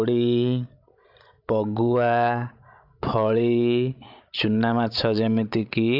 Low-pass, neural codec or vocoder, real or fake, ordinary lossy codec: 5.4 kHz; codec, 16 kHz, 16 kbps, FreqCodec, larger model; fake; AAC, 48 kbps